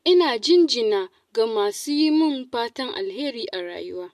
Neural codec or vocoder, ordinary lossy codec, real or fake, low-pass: none; MP3, 64 kbps; real; 14.4 kHz